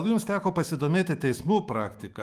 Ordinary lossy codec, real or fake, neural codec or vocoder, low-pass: Opus, 32 kbps; fake; autoencoder, 48 kHz, 128 numbers a frame, DAC-VAE, trained on Japanese speech; 14.4 kHz